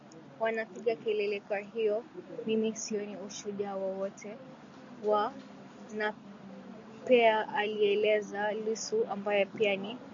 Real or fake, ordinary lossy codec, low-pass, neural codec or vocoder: real; AAC, 64 kbps; 7.2 kHz; none